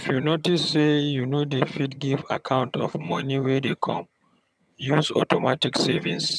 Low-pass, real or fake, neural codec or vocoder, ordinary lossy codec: none; fake; vocoder, 22.05 kHz, 80 mel bands, HiFi-GAN; none